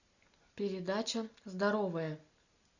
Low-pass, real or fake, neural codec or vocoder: 7.2 kHz; real; none